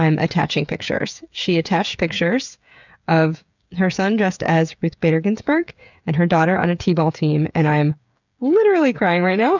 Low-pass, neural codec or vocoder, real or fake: 7.2 kHz; codec, 16 kHz, 8 kbps, FreqCodec, smaller model; fake